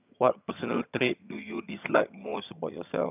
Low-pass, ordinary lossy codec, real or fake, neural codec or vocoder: 3.6 kHz; none; fake; vocoder, 22.05 kHz, 80 mel bands, HiFi-GAN